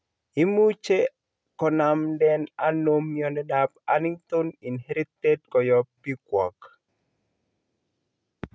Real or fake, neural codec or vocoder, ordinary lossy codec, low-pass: real; none; none; none